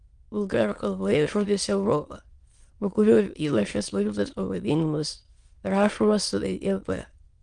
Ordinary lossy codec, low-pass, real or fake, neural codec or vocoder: Opus, 24 kbps; 9.9 kHz; fake; autoencoder, 22.05 kHz, a latent of 192 numbers a frame, VITS, trained on many speakers